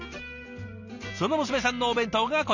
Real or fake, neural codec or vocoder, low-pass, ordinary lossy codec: real; none; 7.2 kHz; none